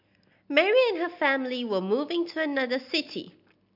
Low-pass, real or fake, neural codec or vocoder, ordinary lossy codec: 5.4 kHz; real; none; AAC, 48 kbps